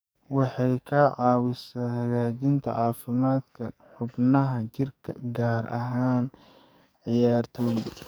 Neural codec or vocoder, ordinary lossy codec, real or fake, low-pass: codec, 44.1 kHz, 2.6 kbps, SNAC; none; fake; none